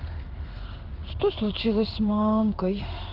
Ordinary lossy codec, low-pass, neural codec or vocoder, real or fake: Opus, 16 kbps; 5.4 kHz; codec, 16 kHz in and 24 kHz out, 1 kbps, XY-Tokenizer; fake